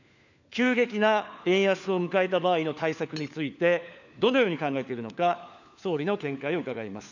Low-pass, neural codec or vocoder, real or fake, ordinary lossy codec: 7.2 kHz; codec, 16 kHz, 4 kbps, FunCodec, trained on LibriTTS, 50 frames a second; fake; none